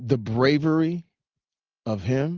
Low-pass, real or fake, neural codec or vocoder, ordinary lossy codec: 7.2 kHz; real; none; Opus, 32 kbps